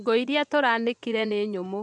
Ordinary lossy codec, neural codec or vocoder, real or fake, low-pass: none; vocoder, 24 kHz, 100 mel bands, Vocos; fake; 10.8 kHz